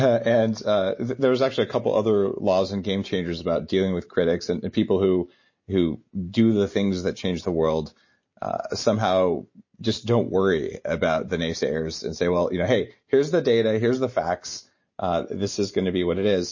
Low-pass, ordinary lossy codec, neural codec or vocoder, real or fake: 7.2 kHz; MP3, 32 kbps; none; real